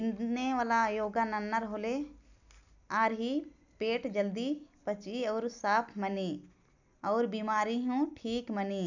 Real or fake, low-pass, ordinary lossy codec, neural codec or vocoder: real; 7.2 kHz; none; none